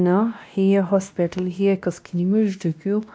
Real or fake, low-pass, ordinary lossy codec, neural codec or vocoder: fake; none; none; codec, 16 kHz, 1 kbps, X-Codec, WavLM features, trained on Multilingual LibriSpeech